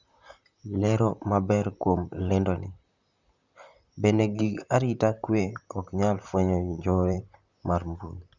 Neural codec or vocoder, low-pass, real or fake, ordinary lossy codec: vocoder, 44.1 kHz, 128 mel bands, Pupu-Vocoder; 7.2 kHz; fake; Opus, 64 kbps